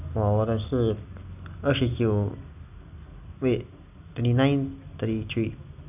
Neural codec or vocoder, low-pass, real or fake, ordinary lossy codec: none; 3.6 kHz; real; AAC, 32 kbps